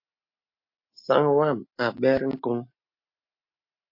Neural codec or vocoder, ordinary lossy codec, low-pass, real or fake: none; MP3, 32 kbps; 5.4 kHz; real